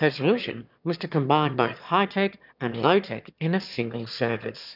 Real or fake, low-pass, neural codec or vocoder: fake; 5.4 kHz; autoencoder, 22.05 kHz, a latent of 192 numbers a frame, VITS, trained on one speaker